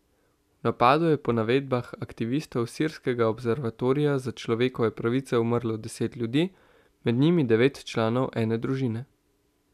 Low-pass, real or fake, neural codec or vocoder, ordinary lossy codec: 14.4 kHz; real; none; none